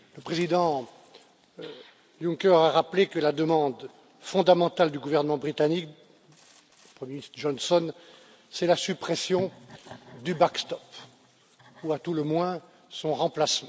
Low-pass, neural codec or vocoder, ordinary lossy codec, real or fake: none; none; none; real